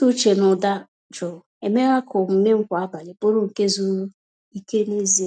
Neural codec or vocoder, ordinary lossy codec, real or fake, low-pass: none; none; real; 9.9 kHz